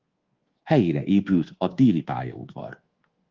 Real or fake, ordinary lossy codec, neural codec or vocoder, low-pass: fake; Opus, 16 kbps; codec, 24 kHz, 1.2 kbps, DualCodec; 7.2 kHz